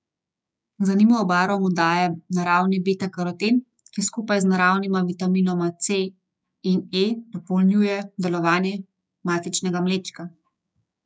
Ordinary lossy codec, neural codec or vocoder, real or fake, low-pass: none; codec, 16 kHz, 6 kbps, DAC; fake; none